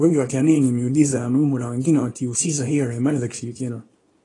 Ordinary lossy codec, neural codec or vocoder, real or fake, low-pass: AAC, 32 kbps; codec, 24 kHz, 0.9 kbps, WavTokenizer, small release; fake; 10.8 kHz